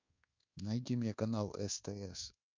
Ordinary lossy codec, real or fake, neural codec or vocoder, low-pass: MP3, 64 kbps; fake; codec, 24 kHz, 1.2 kbps, DualCodec; 7.2 kHz